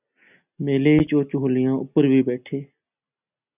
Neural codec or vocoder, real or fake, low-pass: none; real; 3.6 kHz